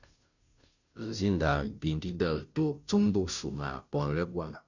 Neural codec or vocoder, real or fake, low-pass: codec, 16 kHz, 0.5 kbps, FunCodec, trained on Chinese and English, 25 frames a second; fake; 7.2 kHz